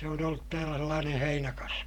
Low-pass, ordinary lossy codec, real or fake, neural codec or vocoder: 19.8 kHz; none; fake; vocoder, 48 kHz, 128 mel bands, Vocos